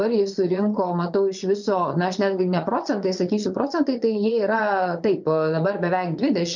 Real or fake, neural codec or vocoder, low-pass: fake; vocoder, 22.05 kHz, 80 mel bands, Vocos; 7.2 kHz